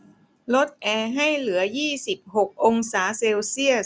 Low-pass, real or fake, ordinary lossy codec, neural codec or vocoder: none; real; none; none